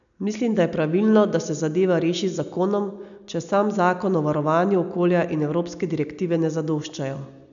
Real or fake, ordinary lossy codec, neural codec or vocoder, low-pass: real; none; none; 7.2 kHz